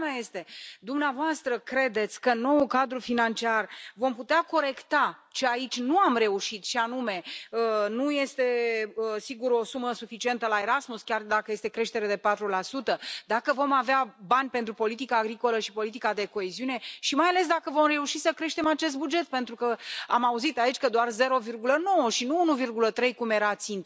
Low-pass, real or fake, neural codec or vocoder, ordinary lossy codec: none; real; none; none